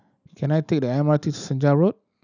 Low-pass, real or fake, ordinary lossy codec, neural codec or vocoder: 7.2 kHz; real; none; none